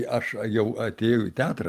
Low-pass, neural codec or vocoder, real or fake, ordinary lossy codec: 14.4 kHz; none; real; Opus, 32 kbps